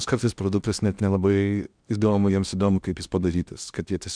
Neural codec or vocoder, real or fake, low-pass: codec, 16 kHz in and 24 kHz out, 0.8 kbps, FocalCodec, streaming, 65536 codes; fake; 9.9 kHz